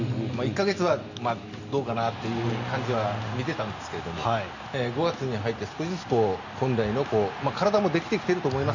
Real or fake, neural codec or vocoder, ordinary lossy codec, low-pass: real; none; none; 7.2 kHz